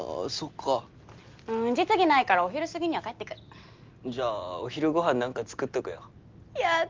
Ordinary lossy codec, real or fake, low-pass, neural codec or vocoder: Opus, 32 kbps; real; 7.2 kHz; none